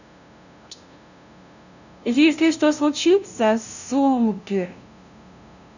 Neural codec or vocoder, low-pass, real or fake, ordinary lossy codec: codec, 16 kHz, 0.5 kbps, FunCodec, trained on LibriTTS, 25 frames a second; 7.2 kHz; fake; none